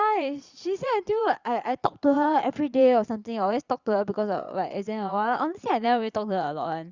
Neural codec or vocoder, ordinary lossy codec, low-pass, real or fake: vocoder, 22.05 kHz, 80 mel bands, Vocos; Opus, 64 kbps; 7.2 kHz; fake